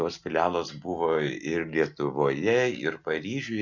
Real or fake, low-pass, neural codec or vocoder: real; 7.2 kHz; none